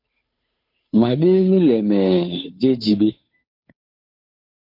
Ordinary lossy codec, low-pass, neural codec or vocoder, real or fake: MP3, 32 kbps; 5.4 kHz; codec, 16 kHz, 2 kbps, FunCodec, trained on Chinese and English, 25 frames a second; fake